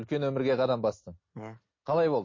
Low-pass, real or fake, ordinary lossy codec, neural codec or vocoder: 7.2 kHz; real; MP3, 32 kbps; none